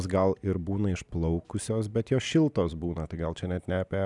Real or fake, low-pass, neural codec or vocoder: real; 10.8 kHz; none